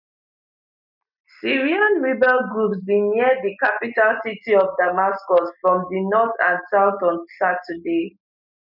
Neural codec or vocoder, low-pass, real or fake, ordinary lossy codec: none; 5.4 kHz; real; none